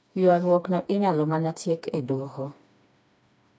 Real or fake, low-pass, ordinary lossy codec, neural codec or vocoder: fake; none; none; codec, 16 kHz, 2 kbps, FreqCodec, smaller model